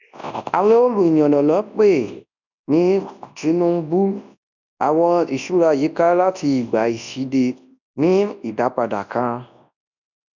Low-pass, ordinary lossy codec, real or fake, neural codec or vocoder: 7.2 kHz; none; fake; codec, 24 kHz, 0.9 kbps, WavTokenizer, large speech release